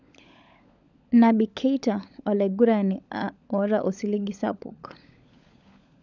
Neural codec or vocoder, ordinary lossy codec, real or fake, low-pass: codec, 16 kHz, 16 kbps, FunCodec, trained on LibriTTS, 50 frames a second; none; fake; 7.2 kHz